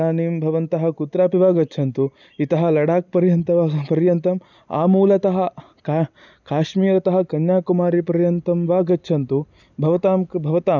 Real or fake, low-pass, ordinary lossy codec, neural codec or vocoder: real; 7.2 kHz; none; none